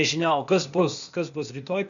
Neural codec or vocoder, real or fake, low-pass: codec, 16 kHz, 0.8 kbps, ZipCodec; fake; 7.2 kHz